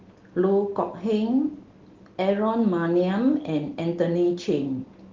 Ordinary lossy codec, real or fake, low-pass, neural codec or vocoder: Opus, 16 kbps; real; 7.2 kHz; none